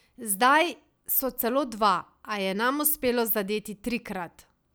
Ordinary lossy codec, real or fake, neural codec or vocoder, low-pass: none; real; none; none